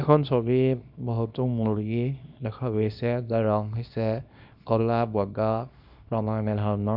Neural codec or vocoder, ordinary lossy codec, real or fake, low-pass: codec, 24 kHz, 0.9 kbps, WavTokenizer, small release; none; fake; 5.4 kHz